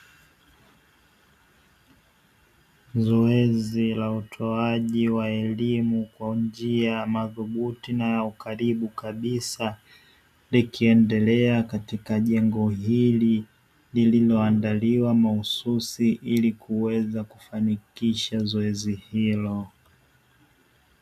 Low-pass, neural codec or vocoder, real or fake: 14.4 kHz; none; real